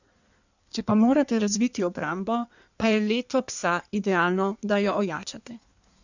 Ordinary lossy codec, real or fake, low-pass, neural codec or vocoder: none; fake; 7.2 kHz; codec, 16 kHz in and 24 kHz out, 1.1 kbps, FireRedTTS-2 codec